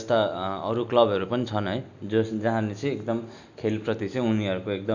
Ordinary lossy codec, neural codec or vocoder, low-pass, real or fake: none; none; 7.2 kHz; real